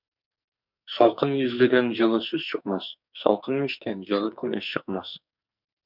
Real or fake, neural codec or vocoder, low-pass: fake; codec, 44.1 kHz, 2.6 kbps, SNAC; 5.4 kHz